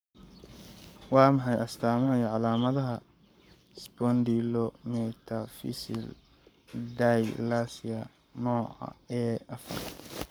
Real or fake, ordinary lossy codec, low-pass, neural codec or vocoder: fake; none; none; codec, 44.1 kHz, 7.8 kbps, Pupu-Codec